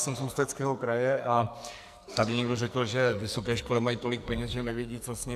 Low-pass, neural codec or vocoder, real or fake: 14.4 kHz; codec, 44.1 kHz, 2.6 kbps, SNAC; fake